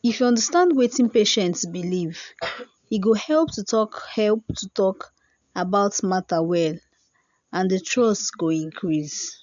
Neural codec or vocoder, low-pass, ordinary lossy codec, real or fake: none; 7.2 kHz; none; real